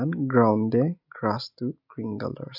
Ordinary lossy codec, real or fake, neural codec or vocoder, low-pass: none; real; none; 5.4 kHz